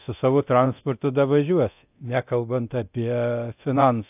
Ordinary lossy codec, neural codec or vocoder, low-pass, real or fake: AAC, 32 kbps; codec, 24 kHz, 0.9 kbps, DualCodec; 3.6 kHz; fake